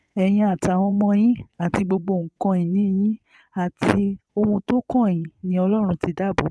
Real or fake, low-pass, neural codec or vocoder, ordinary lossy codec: fake; none; vocoder, 22.05 kHz, 80 mel bands, WaveNeXt; none